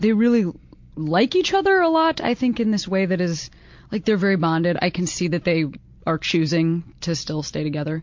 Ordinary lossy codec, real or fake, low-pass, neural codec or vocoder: MP3, 48 kbps; real; 7.2 kHz; none